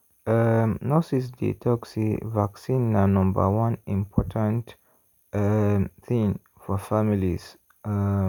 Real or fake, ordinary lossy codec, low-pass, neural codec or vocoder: real; none; 19.8 kHz; none